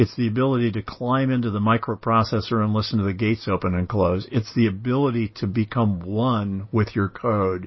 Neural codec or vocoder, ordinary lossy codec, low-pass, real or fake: none; MP3, 24 kbps; 7.2 kHz; real